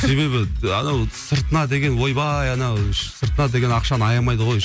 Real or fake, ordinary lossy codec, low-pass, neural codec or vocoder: real; none; none; none